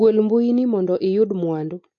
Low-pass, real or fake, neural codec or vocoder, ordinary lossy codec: 7.2 kHz; real; none; AAC, 48 kbps